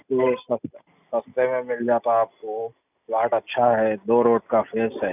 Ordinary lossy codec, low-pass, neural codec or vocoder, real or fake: none; 3.6 kHz; none; real